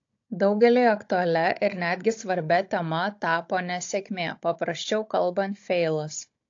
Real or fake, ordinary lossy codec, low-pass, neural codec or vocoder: fake; AAC, 48 kbps; 7.2 kHz; codec, 16 kHz, 16 kbps, FunCodec, trained on Chinese and English, 50 frames a second